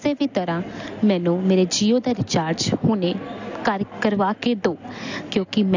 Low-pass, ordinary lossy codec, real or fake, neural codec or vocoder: 7.2 kHz; AAC, 48 kbps; real; none